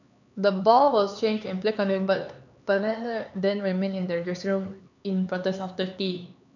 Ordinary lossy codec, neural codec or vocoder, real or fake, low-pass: none; codec, 16 kHz, 4 kbps, X-Codec, HuBERT features, trained on LibriSpeech; fake; 7.2 kHz